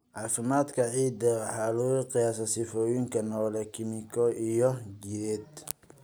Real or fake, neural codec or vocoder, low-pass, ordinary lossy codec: real; none; none; none